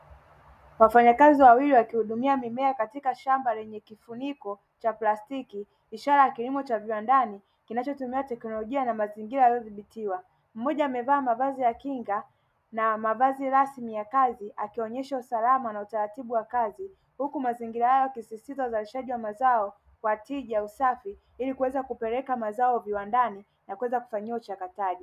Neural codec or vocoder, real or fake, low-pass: none; real; 14.4 kHz